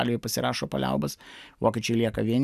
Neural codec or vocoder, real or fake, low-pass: none; real; 14.4 kHz